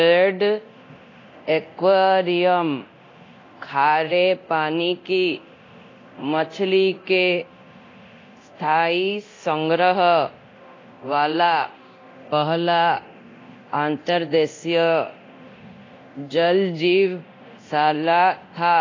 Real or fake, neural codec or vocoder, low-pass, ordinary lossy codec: fake; codec, 24 kHz, 0.9 kbps, DualCodec; 7.2 kHz; AAC, 32 kbps